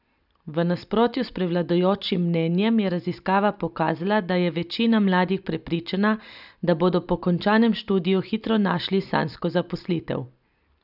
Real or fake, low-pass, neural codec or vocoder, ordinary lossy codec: real; 5.4 kHz; none; none